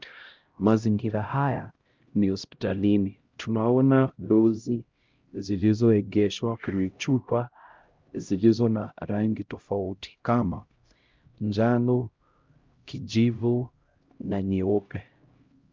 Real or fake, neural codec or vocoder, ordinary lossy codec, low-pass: fake; codec, 16 kHz, 0.5 kbps, X-Codec, HuBERT features, trained on LibriSpeech; Opus, 24 kbps; 7.2 kHz